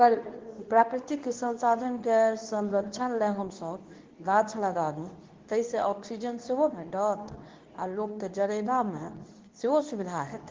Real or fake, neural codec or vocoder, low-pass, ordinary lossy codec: fake; codec, 24 kHz, 0.9 kbps, WavTokenizer, medium speech release version 2; 7.2 kHz; Opus, 16 kbps